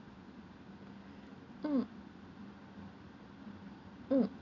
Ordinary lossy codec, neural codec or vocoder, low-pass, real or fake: none; none; 7.2 kHz; real